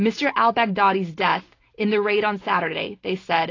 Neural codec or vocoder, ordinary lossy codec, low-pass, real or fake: none; AAC, 32 kbps; 7.2 kHz; real